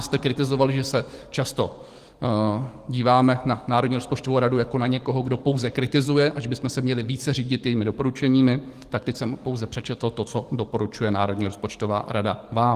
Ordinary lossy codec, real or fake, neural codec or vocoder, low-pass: Opus, 24 kbps; fake; codec, 44.1 kHz, 7.8 kbps, DAC; 14.4 kHz